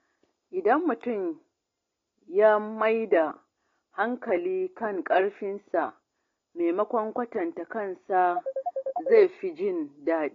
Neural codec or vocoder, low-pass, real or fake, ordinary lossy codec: none; 7.2 kHz; real; AAC, 32 kbps